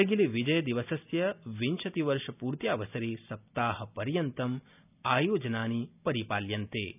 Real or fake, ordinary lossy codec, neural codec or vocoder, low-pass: real; none; none; 3.6 kHz